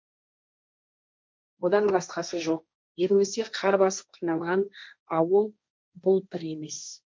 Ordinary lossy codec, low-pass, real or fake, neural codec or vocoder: none; none; fake; codec, 16 kHz, 1.1 kbps, Voila-Tokenizer